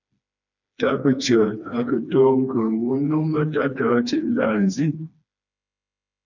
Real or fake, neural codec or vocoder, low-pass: fake; codec, 16 kHz, 2 kbps, FreqCodec, smaller model; 7.2 kHz